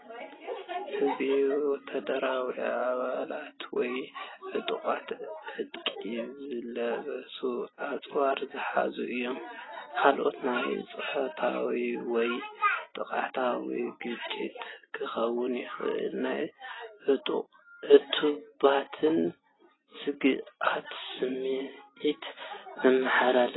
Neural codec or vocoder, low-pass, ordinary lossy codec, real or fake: none; 7.2 kHz; AAC, 16 kbps; real